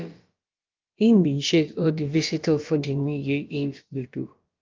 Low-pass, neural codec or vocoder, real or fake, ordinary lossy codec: 7.2 kHz; codec, 16 kHz, about 1 kbps, DyCAST, with the encoder's durations; fake; Opus, 24 kbps